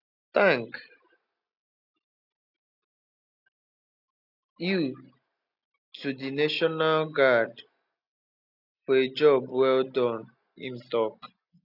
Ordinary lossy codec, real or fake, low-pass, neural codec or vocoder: none; real; 5.4 kHz; none